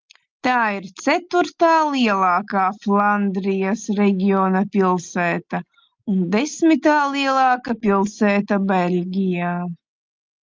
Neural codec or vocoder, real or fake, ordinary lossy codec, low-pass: none; real; Opus, 24 kbps; 7.2 kHz